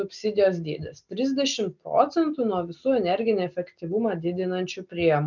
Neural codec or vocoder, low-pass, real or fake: none; 7.2 kHz; real